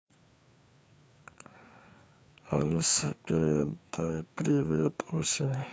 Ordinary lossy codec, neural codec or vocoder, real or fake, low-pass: none; codec, 16 kHz, 4 kbps, FreqCodec, larger model; fake; none